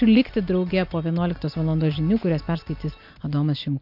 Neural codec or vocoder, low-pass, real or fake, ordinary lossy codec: none; 5.4 kHz; real; AAC, 48 kbps